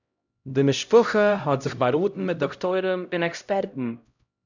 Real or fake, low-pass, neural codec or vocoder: fake; 7.2 kHz; codec, 16 kHz, 0.5 kbps, X-Codec, HuBERT features, trained on LibriSpeech